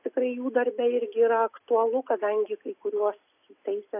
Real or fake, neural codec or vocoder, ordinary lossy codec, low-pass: real; none; AAC, 24 kbps; 3.6 kHz